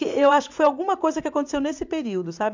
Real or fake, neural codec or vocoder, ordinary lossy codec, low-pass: fake; vocoder, 44.1 kHz, 80 mel bands, Vocos; MP3, 64 kbps; 7.2 kHz